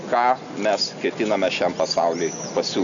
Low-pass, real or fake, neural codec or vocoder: 7.2 kHz; real; none